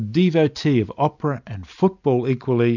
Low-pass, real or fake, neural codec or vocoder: 7.2 kHz; real; none